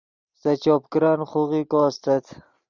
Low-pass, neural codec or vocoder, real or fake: 7.2 kHz; none; real